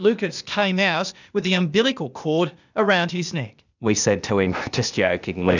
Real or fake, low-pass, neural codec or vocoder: fake; 7.2 kHz; codec, 16 kHz, 0.8 kbps, ZipCodec